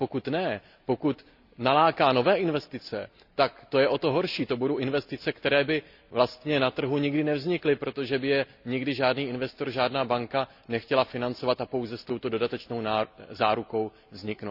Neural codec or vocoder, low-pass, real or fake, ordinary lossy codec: none; 5.4 kHz; real; none